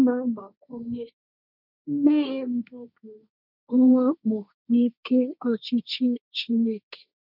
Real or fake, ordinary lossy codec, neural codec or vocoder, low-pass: fake; none; codec, 16 kHz, 1.1 kbps, Voila-Tokenizer; 5.4 kHz